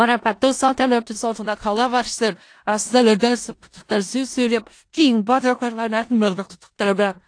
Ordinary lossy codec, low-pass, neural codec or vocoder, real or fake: AAC, 48 kbps; 9.9 kHz; codec, 16 kHz in and 24 kHz out, 0.4 kbps, LongCat-Audio-Codec, four codebook decoder; fake